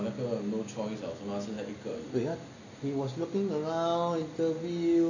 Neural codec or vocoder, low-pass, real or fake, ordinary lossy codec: none; 7.2 kHz; real; none